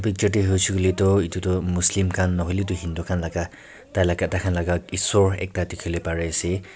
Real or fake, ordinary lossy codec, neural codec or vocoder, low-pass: real; none; none; none